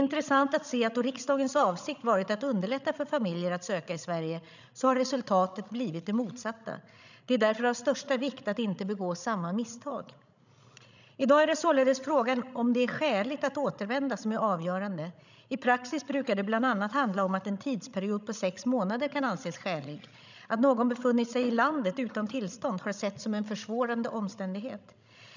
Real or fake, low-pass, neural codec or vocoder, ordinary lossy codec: fake; 7.2 kHz; codec, 16 kHz, 16 kbps, FreqCodec, larger model; none